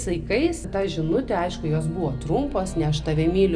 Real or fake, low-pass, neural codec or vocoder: real; 9.9 kHz; none